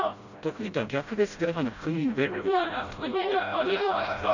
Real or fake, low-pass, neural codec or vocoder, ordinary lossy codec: fake; 7.2 kHz; codec, 16 kHz, 0.5 kbps, FreqCodec, smaller model; none